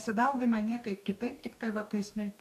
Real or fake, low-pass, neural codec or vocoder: fake; 14.4 kHz; codec, 44.1 kHz, 2.6 kbps, DAC